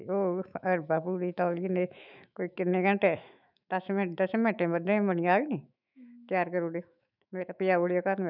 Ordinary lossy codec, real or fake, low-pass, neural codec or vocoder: none; fake; 5.4 kHz; autoencoder, 48 kHz, 128 numbers a frame, DAC-VAE, trained on Japanese speech